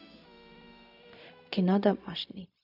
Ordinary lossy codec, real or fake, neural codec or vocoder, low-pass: none; fake; codec, 16 kHz, 0.4 kbps, LongCat-Audio-Codec; 5.4 kHz